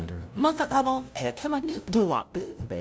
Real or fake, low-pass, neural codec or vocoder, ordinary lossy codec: fake; none; codec, 16 kHz, 0.5 kbps, FunCodec, trained on LibriTTS, 25 frames a second; none